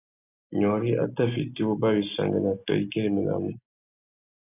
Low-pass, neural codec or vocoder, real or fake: 3.6 kHz; none; real